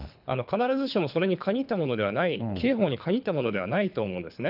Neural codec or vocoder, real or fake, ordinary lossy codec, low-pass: codec, 24 kHz, 3 kbps, HILCodec; fake; none; 5.4 kHz